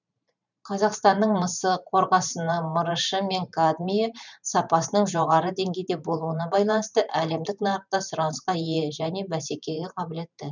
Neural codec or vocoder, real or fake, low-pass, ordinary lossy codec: vocoder, 44.1 kHz, 128 mel bands every 512 samples, BigVGAN v2; fake; 7.2 kHz; none